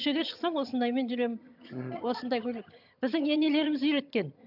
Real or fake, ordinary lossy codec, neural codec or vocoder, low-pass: fake; none; vocoder, 22.05 kHz, 80 mel bands, HiFi-GAN; 5.4 kHz